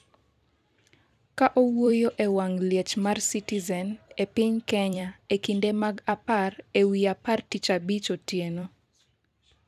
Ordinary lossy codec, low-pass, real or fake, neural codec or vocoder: none; 14.4 kHz; fake; vocoder, 44.1 kHz, 128 mel bands every 256 samples, BigVGAN v2